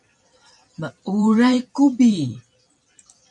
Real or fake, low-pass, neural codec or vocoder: fake; 10.8 kHz; vocoder, 44.1 kHz, 128 mel bands every 256 samples, BigVGAN v2